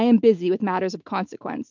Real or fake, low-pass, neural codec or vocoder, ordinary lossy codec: real; 7.2 kHz; none; MP3, 64 kbps